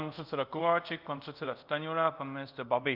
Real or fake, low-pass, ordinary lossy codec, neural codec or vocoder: fake; 5.4 kHz; Opus, 24 kbps; codec, 24 kHz, 0.5 kbps, DualCodec